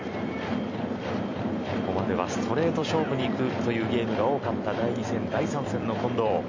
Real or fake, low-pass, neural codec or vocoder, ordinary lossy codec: fake; 7.2 kHz; vocoder, 44.1 kHz, 128 mel bands every 256 samples, BigVGAN v2; MP3, 64 kbps